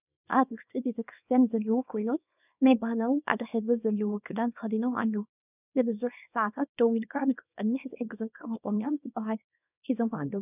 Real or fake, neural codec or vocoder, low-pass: fake; codec, 24 kHz, 0.9 kbps, WavTokenizer, small release; 3.6 kHz